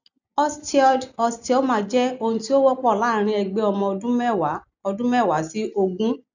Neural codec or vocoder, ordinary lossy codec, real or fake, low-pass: none; none; real; 7.2 kHz